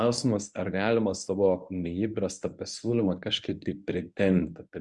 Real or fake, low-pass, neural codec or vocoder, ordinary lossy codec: fake; 10.8 kHz; codec, 24 kHz, 0.9 kbps, WavTokenizer, medium speech release version 1; Opus, 64 kbps